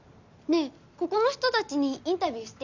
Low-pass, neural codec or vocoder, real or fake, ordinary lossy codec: 7.2 kHz; none; real; none